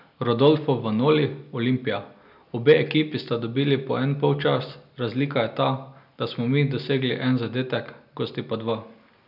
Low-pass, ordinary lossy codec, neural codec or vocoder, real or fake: 5.4 kHz; none; none; real